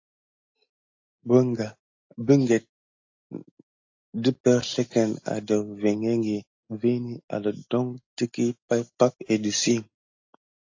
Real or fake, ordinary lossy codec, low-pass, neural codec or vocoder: real; AAC, 48 kbps; 7.2 kHz; none